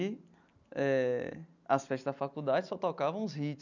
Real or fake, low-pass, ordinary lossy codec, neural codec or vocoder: real; 7.2 kHz; none; none